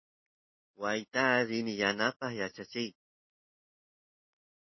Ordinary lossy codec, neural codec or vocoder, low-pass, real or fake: MP3, 24 kbps; none; 7.2 kHz; real